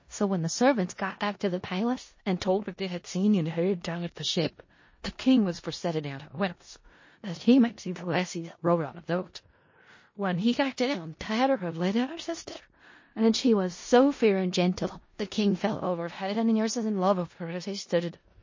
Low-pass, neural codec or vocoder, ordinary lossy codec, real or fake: 7.2 kHz; codec, 16 kHz in and 24 kHz out, 0.4 kbps, LongCat-Audio-Codec, four codebook decoder; MP3, 32 kbps; fake